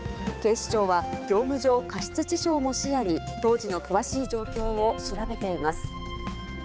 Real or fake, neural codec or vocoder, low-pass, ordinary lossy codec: fake; codec, 16 kHz, 4 kbps, X-Codec, HuBERT features, trained on balanced general audio; none; none